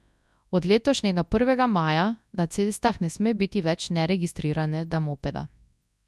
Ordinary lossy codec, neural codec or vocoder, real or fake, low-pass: none; codec, 24 kHz, 0.9 kbps, WavTokenizer, large speech release; fake; none